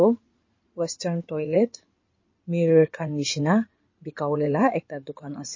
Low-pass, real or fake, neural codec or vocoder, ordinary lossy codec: 7.2 kHz; fake; codec, 16 kHz in and 24 kHz out, 2.2 kbps, FireRedTTS-2 codec; MP3, 32 kbps